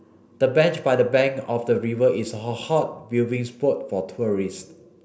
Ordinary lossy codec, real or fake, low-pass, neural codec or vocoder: none; real; none; none